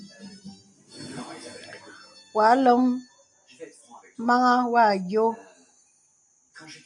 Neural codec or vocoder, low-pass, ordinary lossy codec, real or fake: none; 10.8 kHz; AAC, 64 kbps; real